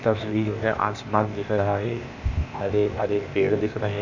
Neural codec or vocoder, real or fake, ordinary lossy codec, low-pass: codec, 16 kHz, 0.8 kbps, ZipCodec; fake; none; 7.2 kHz